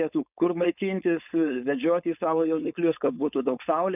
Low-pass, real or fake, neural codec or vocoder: 3.6 kHz; fake; codec, 16 kHz, 4.8 kbps, FACodec